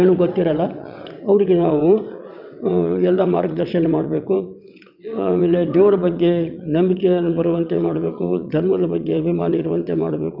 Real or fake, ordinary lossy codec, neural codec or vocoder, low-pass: fake; none; vocoder, 22.05 kHz, 80 mel bands, WaveNeXt; 5.4 kHz